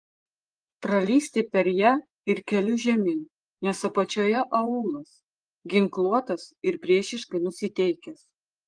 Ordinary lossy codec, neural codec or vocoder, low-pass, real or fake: Opus, 24 kbps; vocoder, 24 kHz, 100 mel bands, Vocos; 9.9 kHz; fake